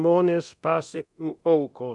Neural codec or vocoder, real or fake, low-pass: codec, 24 kHz, 0.9 kbps, DualCodec; fake; 10.8 kHz